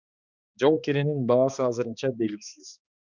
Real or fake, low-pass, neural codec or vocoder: fake; 7.2 kHz; codec, 16 kHz, 2 kbps, X-Codec, HuBERT features, trained on balanced general audio